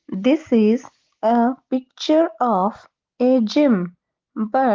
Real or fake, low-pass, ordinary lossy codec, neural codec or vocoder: real; 7.2 kHz; Opus, 16 kbps; none